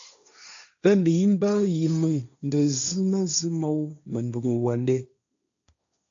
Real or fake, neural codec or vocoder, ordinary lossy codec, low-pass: fake; codec, 16 kHz, 1.1 kbps, Voila-Tokenizer; AAC, 64 kbps; 7.2 kHz